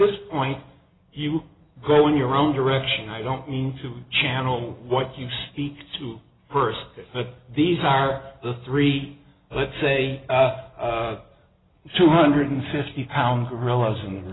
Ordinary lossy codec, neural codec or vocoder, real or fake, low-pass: AAC, 16 kbps; none; real; 7.2 kHz